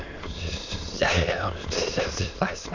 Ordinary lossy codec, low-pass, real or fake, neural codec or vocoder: none; 7.2 kHz; fake; autoencoder, 22.05 kHz, a latent of 192 numbers a frame, VITS, trained on many speakers